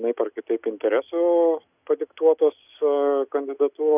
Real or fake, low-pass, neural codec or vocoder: real; 3.6 kHz; none